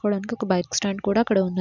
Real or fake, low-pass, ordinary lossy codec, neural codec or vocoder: real; 7.2 kHz; none; none